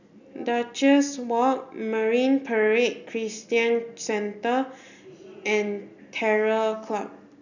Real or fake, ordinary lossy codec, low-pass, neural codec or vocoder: real; none; 7.2 kHz; none